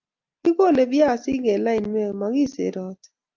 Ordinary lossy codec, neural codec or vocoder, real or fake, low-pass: Opus, 24 kbps; none; real; 7.2 kHz